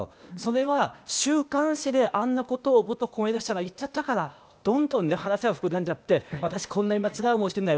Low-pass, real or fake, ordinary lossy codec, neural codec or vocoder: none; fake; none; codec, 16 kHz, 0.8 kbps, ZipCodec